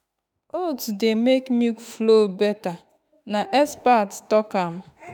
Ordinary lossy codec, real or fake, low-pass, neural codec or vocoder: none; fake; 19.8 kHz; autoencoder, 48 kHz, 32 numbers a frame, DAC-VAE, trained on Japanese speech